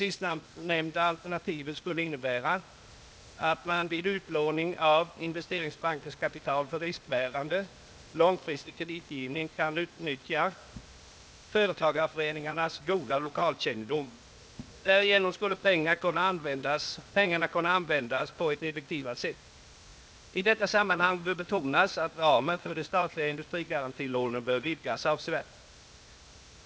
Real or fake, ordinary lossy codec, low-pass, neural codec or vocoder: fake; none; none; codec, 16 kHz, 0.8 kbps, ZipCodec